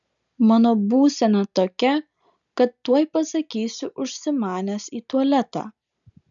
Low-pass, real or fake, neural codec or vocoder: 7.2 kHz; real; none